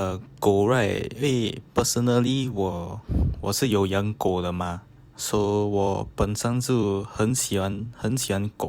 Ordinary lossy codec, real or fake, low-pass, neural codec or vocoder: Opus, 64 kbps; fake; 19.8 kHz; vocoder, 44.1 kHz, 128 mel bands every 256 samples, BigVGAN v2